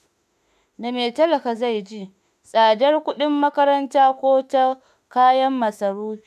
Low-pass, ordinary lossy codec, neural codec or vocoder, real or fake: 14.4 kHz; none; autoencoder, 48 kHz, 32 numbers a frame, DAC-VAE, trained on Japanese speech; fake